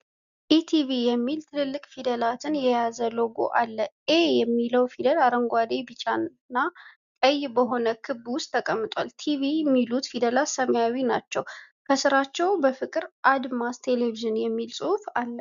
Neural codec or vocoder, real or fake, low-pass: none; real; 7.2 kHz